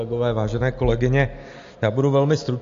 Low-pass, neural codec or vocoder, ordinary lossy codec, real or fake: 7.2 kHz; none; MP3, 48 kbps; real